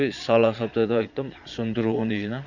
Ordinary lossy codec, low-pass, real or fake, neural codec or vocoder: none; 7.2 kHz; fake; vocoder, 22.05 kHz, 80 mel bands, Vocos